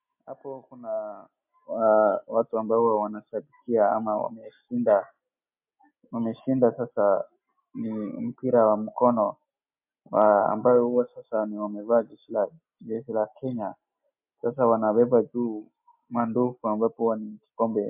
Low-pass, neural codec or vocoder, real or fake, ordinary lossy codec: 3.6 kHz; none; real; MP3, 32 kbps